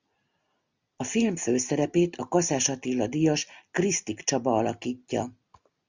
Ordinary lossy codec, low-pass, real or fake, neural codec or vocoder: Opus, 64 kbps; 7.2 kHz; real; none